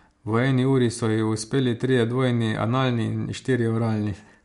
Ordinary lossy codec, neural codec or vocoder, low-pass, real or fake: MP3, 64 kbps; none; 10.8 kHz; real